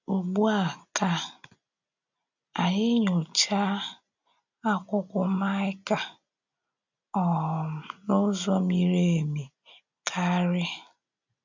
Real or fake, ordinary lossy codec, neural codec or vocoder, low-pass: real; none; none; 7.2 kHz